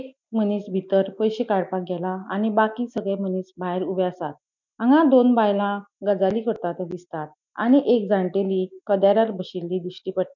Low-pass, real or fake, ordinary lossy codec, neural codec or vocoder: 7.2 kHz; real; none; none